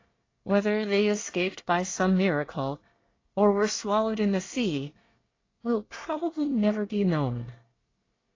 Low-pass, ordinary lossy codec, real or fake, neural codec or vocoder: 7.2 kHz; AAC, 32 kbps; fake; codec, 24 kHz, 1 kbps, SNAC